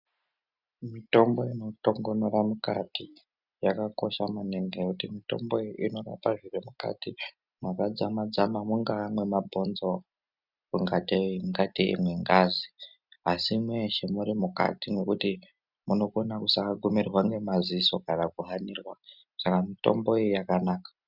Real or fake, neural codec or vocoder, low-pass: real; none; 5.4 kHz